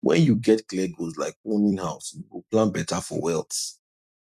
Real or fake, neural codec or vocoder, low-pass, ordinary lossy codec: fake; vocoder, 44.1 kHz, 128 mel bands every 256 samples, BigVGAN v2; 14.4 kHz; none